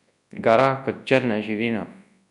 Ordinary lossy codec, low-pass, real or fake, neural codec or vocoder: none; 10.8 kHz; fake; codec, 24 kHz, 0.9 kbps, WavTokenizer, large speech release